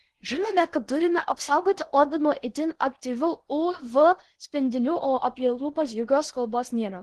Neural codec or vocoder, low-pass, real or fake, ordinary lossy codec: codec, 16 kHz in and 24 kHz out, 0.8 kbps, FocalCodec, streaming, 65536 codes; 10.8 kHz; fake; Opus, 16 kbps